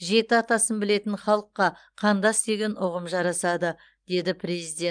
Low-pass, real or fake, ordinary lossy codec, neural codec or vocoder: 9.9 kHz; real; Opus, 32 kbps; none